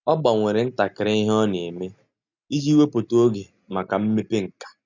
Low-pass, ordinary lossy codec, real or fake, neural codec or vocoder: 7.2 kHz; none; real; none